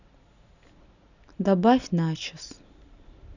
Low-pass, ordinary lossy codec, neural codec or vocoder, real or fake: 7.2 kHz; none; none; real